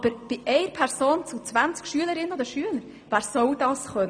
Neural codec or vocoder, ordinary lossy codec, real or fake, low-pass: none; none; real; 9.9 kHz